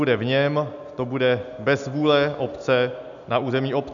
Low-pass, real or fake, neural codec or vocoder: 7.2 kHz; real; none